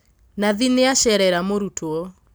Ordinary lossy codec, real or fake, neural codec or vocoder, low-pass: none; real; none; none